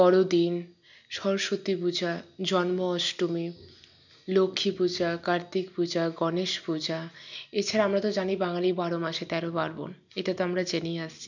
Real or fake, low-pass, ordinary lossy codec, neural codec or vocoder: real; 7.2 kHz; none; none